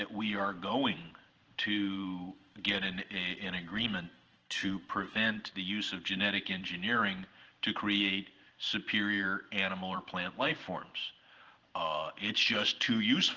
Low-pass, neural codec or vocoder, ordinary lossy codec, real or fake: 7.2 kHz; vocoder, 44.1 kHz, 128 mel bands every 512 samples, BigVGAN v2; Opus, 16 kbps; fake